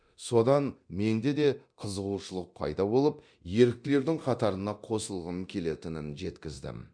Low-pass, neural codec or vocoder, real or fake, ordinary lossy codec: 9.9 kHz; codec, 24 kHz, 0.9 kbps, DualCodec; fake; none